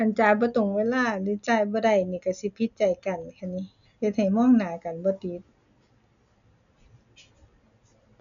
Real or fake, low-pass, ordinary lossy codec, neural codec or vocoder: real; 7.2 kHz; none; none